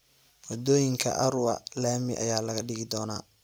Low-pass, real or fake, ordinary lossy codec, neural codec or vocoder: none; real; none; none